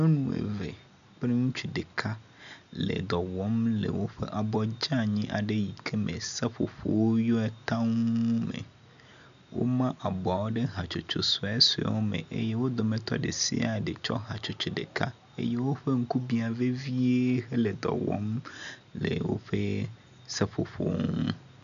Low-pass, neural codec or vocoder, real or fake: 7.2 kHz; none; real